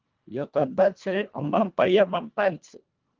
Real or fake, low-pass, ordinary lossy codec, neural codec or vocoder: fake; 7.2 kHz; Opus, 32 kbps; codec, 24 kHz, 1.5 kbps, HILCodec